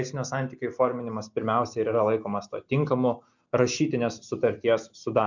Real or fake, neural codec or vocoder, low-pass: real; none; 7.2 kHz